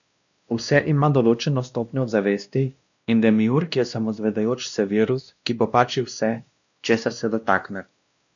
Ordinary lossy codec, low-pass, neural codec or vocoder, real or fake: none; 7.2 kHz; codec, 16 kHz, 1 kbps, X-Codec, WavLM features, trained on Multilingual LibriSpeech; fake